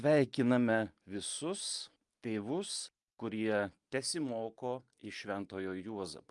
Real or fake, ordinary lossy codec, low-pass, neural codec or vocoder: real; Opus, 32 kbps; 10.8 kHz; none